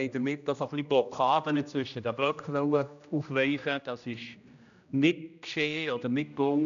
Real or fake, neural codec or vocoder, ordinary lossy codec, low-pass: fake; codec, 16 kHz, 1 kbps, X-Codec, HuBERT features, trained on general audio; none; 7.2 kHz